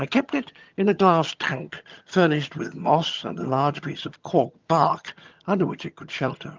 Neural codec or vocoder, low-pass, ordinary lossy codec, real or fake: vocoder, 22.05 kHz, 80 mel bands, HiFi-GAN; 7.2 kHz; Opus, 32 kbps; fake